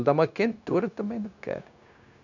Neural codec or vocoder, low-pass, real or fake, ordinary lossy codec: codec, 16 kHz, 0.9 kbps, LongCat-Audio-Codec; 7.2 kHz; fake; none